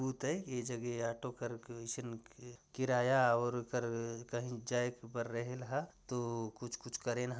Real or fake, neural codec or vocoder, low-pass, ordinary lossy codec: real; none; none; none